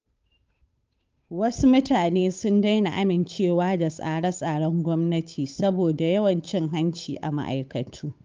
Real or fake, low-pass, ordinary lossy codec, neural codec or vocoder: fake; 7.2 kHz; Opus, 24 kbps; codec, 16 kHz, 8 kbps, FunCodec, trained on Chinese and English, 25 frames a second